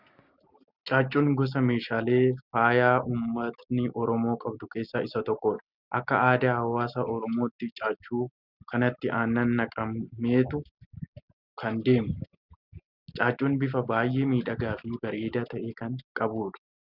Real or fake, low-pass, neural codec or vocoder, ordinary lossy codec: real; 5.4 kHz; none; Opus, 64 kbps